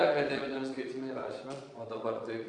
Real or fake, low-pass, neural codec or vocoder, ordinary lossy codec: fake; 9.9 kHz; vocoder, 22.05 kHz, 80 mel bands, Vocos; Opus, 64 kbps